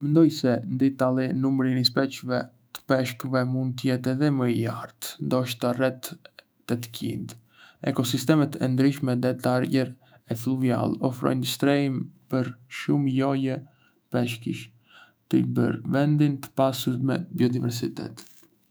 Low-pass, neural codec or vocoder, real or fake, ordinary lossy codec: none; autoencoder, 48 kHz, 128 numbers a frame, DAC-VAE, trained on Japanese speech; fake; none